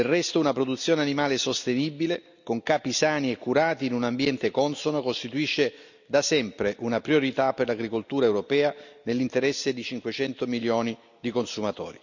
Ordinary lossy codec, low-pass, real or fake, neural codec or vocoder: none; 7.2 kHz; real; none